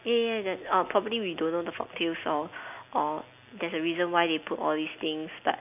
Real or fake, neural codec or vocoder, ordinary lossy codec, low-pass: real; none; none; 3.6 kHz